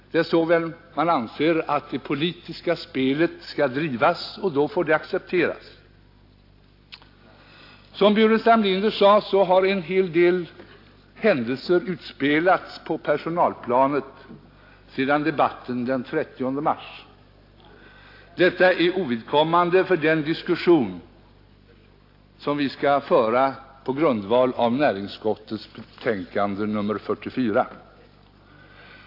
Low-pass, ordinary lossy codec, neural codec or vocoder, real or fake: 5.4 kHz; AAC, 32 kbps; none; real